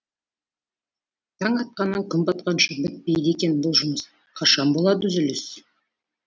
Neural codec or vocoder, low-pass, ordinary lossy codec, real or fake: none; none; none; real